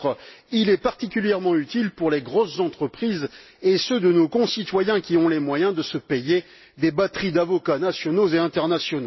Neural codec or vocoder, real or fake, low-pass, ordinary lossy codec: none; real; 7.2 kHz; MP3, 24 kbps